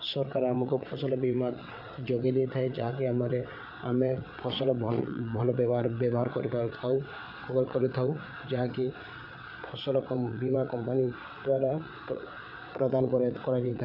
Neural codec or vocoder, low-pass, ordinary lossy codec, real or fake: vocoder, 22.05 kHz, 80 mel bands, WaveNeXt; 5.4 kHz; none; fake